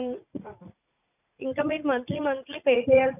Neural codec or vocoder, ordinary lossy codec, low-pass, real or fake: vocoder, 44.1 kHz, 80 mel bands, Vocos; none; 3.6 kHz; fake